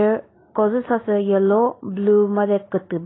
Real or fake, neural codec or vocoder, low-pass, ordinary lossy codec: real; none; 7.2 kHz; AAC, 16 kbps